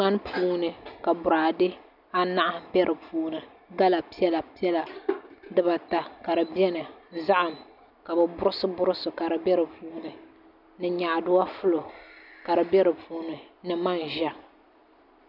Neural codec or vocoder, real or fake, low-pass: none; real; 5.4 kHz